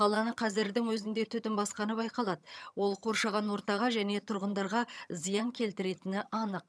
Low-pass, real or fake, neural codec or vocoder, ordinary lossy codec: none; fake; vocoder, 22.05 kHz, 80 mel bands, HiFi-GAN; none